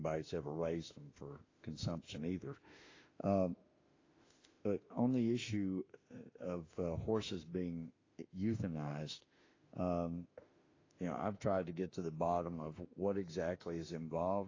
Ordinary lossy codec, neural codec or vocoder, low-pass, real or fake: AAC, 32 kbps; autoencoder, 48 kHz, 32 numbers a frame, DAC-VAE, trained on Japanese speech; 7.2 kHz; fake